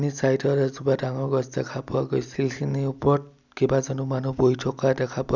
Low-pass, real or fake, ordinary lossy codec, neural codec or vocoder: 7.2 kHz; real; none; none